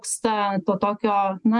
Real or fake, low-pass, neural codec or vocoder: real; 10.8 kHz; none